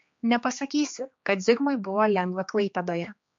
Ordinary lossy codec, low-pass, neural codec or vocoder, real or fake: MP3, 48 kbps; 7.2 kHz; codec, 16 kHz, 2 kbps, X-Codec, HuBERT features, trained on general audio; fake